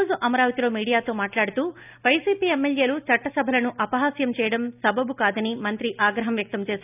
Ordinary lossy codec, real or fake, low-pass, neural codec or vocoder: none; real; 3.6 kHz; none